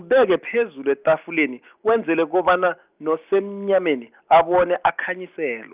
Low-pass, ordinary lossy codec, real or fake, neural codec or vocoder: 3.6 kHz; Opus, 24 kbps; real; none